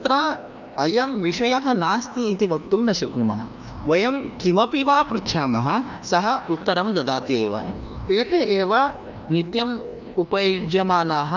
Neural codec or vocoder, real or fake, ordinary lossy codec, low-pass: codec, 16 kHz, 1 kbps, FreqCodec, larger model; fake; none; 7.2 kHz